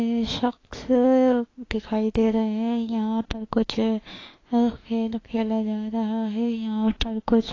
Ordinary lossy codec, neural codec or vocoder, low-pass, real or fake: AAC, 32 kbps; autoencoder, 48 kHz, 32 numbers a frame, DAC-VAE, trained on Japanese speech; 7.2 kHz; fake